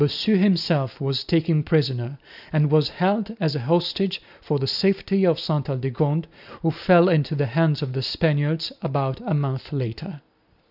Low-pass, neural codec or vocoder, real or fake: 5.4 kHz; none; real